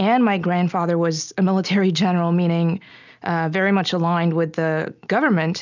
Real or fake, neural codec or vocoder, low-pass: real; none; 7.2 kHz